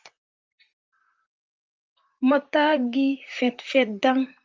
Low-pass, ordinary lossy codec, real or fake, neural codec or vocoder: 7.2 kHz; Opus, 24 kbps; fake; vocoder, 44.1 kHz, 128 mel bands, Pupu-Vocoder